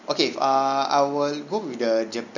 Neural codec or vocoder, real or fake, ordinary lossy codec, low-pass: none; real; none; 7.2 kHz